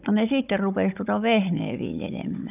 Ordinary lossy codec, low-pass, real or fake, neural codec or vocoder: none; 3.6 kHz; fake; codec, 16 kHz, 16 kbps, FunCodec, trained on Chinese and English, 50 frames a second